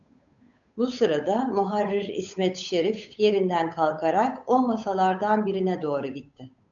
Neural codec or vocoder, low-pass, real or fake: codec, 16 kHz, 8 kbps, FunCodec, trained on Chinese and English, 25 frames a second; 7.2 kHz; fake